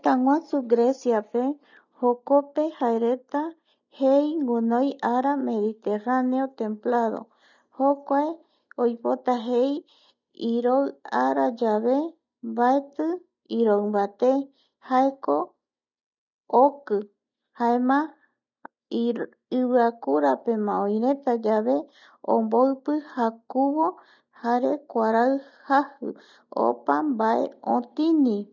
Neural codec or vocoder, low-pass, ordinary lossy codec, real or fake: none; 7.2 kHz; none; real